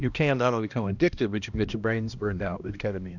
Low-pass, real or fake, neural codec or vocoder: 7.2 kHz; fake; codec, 16 kHz, 1 kbps, X-Codec, HuBERT features, trained on balanced general audio